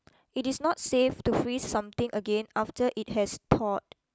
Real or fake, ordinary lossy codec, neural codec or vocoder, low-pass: real; none; none; none